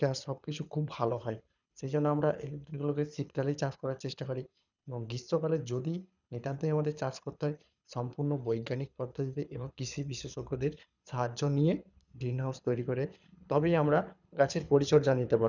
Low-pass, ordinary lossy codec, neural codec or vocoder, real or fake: 7.2 kHz; none; codec, 24 kHz, 6 kbps, HILCodec; fake